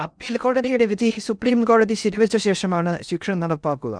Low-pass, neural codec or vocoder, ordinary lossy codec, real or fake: 9.9 kHz; codec, 16 kHz in and 24 kHz out, 0.6 kbps, FocalCodec, streaming, 4096 codes; none; fake